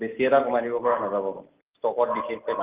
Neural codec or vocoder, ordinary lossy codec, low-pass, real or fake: none; Opus, 32 kbps; 3.6 kHz; real